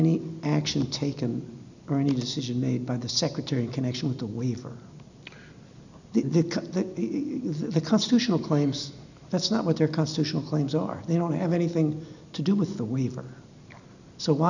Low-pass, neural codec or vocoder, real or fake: 7.2 kHz; none; real